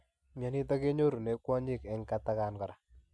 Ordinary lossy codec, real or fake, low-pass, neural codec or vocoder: none; real; none; none